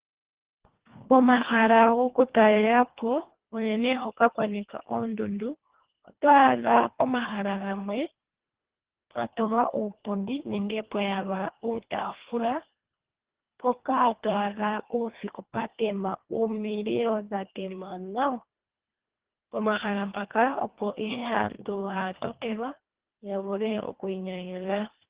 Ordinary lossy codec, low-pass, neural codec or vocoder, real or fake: Opus, 16 kbps; 3.6 kHz; codec, 24 kHz, 1.5 kbps, HILCodec; fake